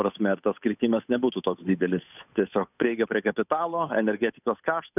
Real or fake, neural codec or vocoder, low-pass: fake; codec, 16 kHz, 8 kbps, FunCodec, trained on Chinese and English, 25 frames a second; 3.6 kHz